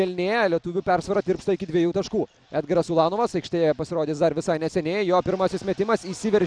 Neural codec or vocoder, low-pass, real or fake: none; 9.9 kHz; real